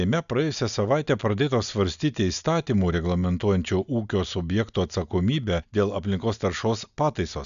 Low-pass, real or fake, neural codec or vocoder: 7.2 kHz; real; none